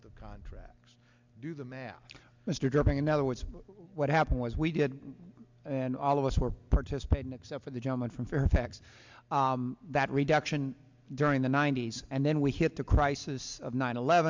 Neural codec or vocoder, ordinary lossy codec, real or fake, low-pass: none; MP3, 64 kbps; real; 7.2 kHz